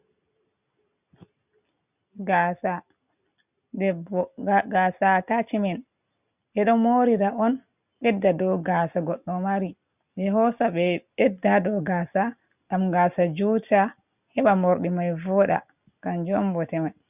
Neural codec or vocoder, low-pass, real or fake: none; 3.6 kHz; real